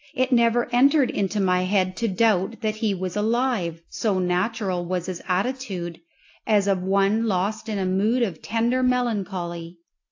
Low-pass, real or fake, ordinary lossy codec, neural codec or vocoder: 7.2 kHz; real; AAC, 48 kbps; none